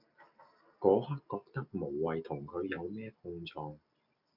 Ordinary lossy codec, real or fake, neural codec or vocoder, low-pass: MP3, 48 kbps; real; none; 5.4 kHz